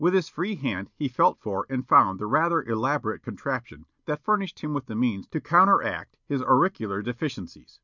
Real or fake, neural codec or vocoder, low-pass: real; none; 7.2 kHz